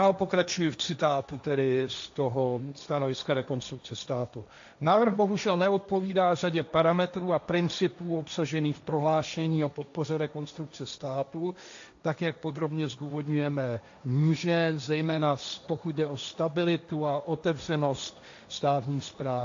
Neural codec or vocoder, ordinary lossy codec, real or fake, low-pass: codec, 16 kHz, 1.1 kbps, Voila-Tokenizer; AAC, 64 kbps; fake; 7.2 kHz